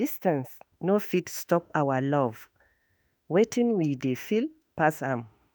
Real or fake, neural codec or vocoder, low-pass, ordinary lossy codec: fake; autoencoder, 48 kHz, 32 numbers a frame, DAC-VAE, trained on Japanese speech; none; none